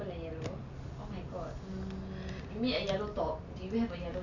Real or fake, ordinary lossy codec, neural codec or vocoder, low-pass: real; none; none; 7.2 kHz